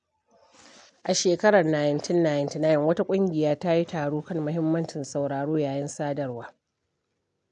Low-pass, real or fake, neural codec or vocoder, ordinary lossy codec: 9.9 kHz; real; none; none